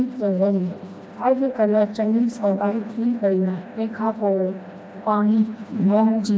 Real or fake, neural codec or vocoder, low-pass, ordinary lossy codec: fake; codec, 16 kHz, 1 kbps, FreqCodec, smaller model; none; none